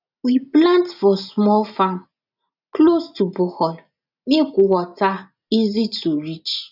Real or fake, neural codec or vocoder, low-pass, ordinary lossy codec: real; none; 5.4 kHz; none